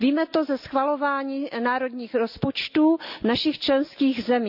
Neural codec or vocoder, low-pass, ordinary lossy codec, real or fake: none; 5.4 kHz; none; real